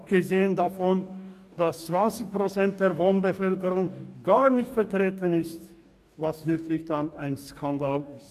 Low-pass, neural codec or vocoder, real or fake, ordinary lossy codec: 14.4 kHz; codec, 44.1 kHz, 2.6 kbps, DAC; fake; AAC, 96 kbps